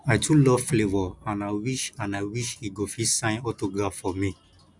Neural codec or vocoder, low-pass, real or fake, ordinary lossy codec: none; 10.8 kHz; real; none